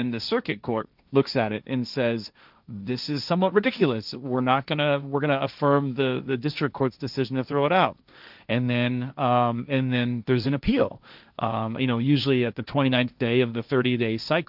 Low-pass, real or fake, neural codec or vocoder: 5.4 kHz; fake; codec, 16 kHz, 1.1 kbps, Voila-Tokenizer